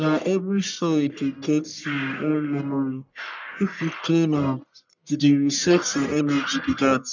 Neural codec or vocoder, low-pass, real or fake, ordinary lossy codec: codec, 44.1 kHz, 1.7 kbps, Pupu-Codec; 7.2 kHz; fake; none